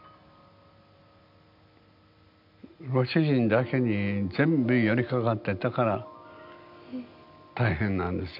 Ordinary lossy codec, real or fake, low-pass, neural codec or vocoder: MP3, 48 kbps; real; 5.4 kHz; none